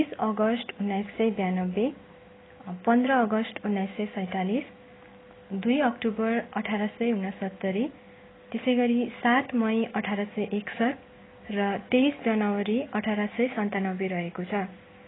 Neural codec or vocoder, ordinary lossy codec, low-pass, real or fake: none; AAC, 16 kbps; 7.2 kHz; real